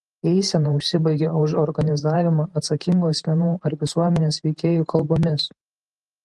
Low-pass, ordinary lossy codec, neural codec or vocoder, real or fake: 10.8 kHz; Opus, 24 kbps; vocoder, 44.1 kHz, 128 mel bands every 512 samples, BigVGAN v2; fake